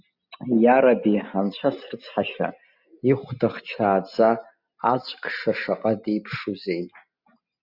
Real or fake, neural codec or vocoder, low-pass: real; none; 5.4 kHz